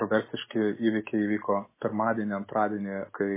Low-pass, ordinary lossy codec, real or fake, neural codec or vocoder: 3.6 kHz; MP3, 16 kbps; real; none